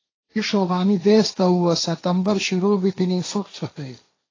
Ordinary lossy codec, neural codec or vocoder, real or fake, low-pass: AAC, 32 kbps; codec, 16 kHz, 1.1 kbps, Voila-Tokenizer; fake; 7.2 kHz